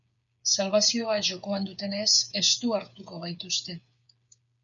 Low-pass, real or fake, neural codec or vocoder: 7.2 kHz; fake; codec, 16 kHz, 8 kbps, FreqCodec, smaller model